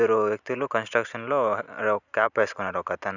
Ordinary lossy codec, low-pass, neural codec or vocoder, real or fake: none; 7.2 kHz; none; real